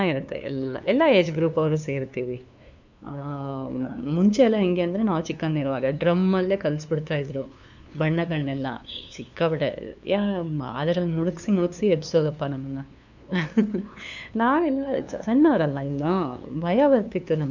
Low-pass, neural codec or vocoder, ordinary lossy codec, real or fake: 7.2 kHz; codec, 16 kHz, 2 kbps, FunCodec, trained on LibriTTS, 25 frames a second; none; fake